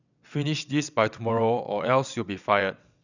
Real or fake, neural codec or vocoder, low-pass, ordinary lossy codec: fake; vocoder, 22.05 kHz, 80 mel bands, WaveNeXt; 7.2 kHz; none